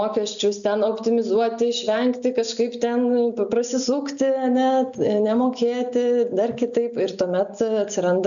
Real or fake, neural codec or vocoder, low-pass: real; none; 7.2 kHz